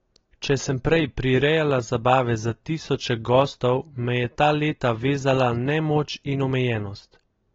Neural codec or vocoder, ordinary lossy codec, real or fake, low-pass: none; AAC, 24 kbps; real; 7.2 kHz